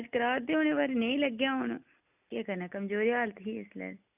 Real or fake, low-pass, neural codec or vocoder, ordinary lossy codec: real; 3.6 kHz; none; none